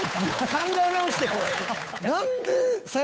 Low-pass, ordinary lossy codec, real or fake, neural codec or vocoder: none; none; fake; codec, 16 kHz, 8 kbps, FunCodec, trained on Chinese and English, 25 frames a second